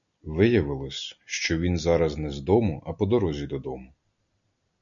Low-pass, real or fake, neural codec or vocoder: 7.2 kHz; real; none